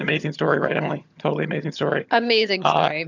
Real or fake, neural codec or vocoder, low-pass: fake; vocoder, 22.05 kHz, 80 mel bands, HiFi-GAN; 7.2 kHz